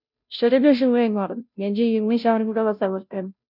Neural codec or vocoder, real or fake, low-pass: codec, 16 kHz, 0.5 kbps, FunCodec, trained on Chinese and English, 25 frames a second; fake; 5.4 kHz